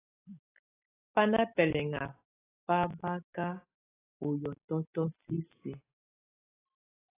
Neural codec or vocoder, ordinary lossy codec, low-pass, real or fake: none; AAC, 16 kbps; 3.6 kHz; real